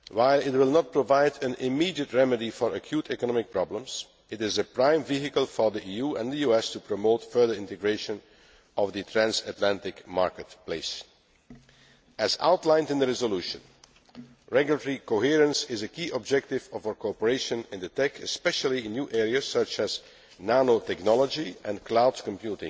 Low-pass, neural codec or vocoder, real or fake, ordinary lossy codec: none; none; real; none